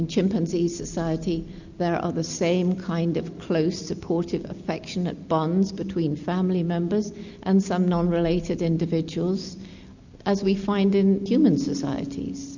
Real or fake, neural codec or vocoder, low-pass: real; none; 7.2 kHz